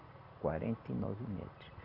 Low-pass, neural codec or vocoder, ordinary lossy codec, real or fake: 5.4 kHz; none; none; real